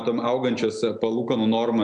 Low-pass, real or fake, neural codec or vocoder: 9.9 kHz; real; none